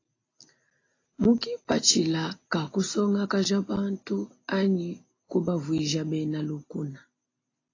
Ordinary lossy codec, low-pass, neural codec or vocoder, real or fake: AAC, 32 kbps; 7.2 kHz; none; real